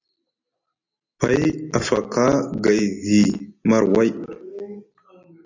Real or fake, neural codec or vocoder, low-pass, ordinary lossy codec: real; none; 7.2 kHz; AAC, 48 kbps